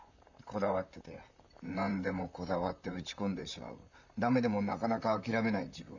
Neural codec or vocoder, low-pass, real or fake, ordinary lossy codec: vocoder, 44.1 kHz, 128 mel bands, Pupu-Vocoder; 7.2 kHz; fake; none